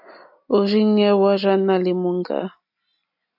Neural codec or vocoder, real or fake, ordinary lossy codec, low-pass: none; real; AAC, 48 kbps; 5.4 kHz